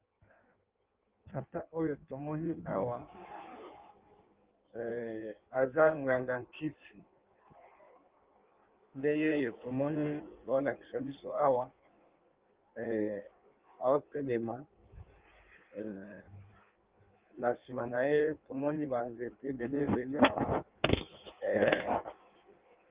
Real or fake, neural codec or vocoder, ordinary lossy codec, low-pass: fake; codec, 16 kHz in and 24 kHz out, 1.1 kbps, FireRedTTS-2 codec; Opus, 32 kbps; 3.6 kHz